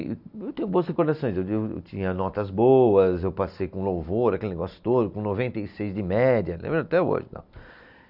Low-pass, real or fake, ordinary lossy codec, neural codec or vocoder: 5.4 kHz; real; none; none